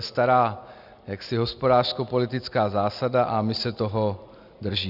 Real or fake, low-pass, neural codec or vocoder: real; 5.4 kHz; none